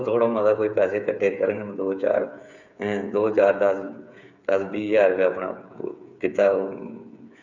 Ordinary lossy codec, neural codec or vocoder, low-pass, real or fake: none; codec, 16 kHz, 8 kbps, FreqCodec, smaller model; 7.2 kHz; fake